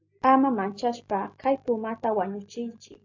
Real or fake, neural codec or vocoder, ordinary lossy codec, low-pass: real; none; AAC, 48 kbps; 7.2 kHz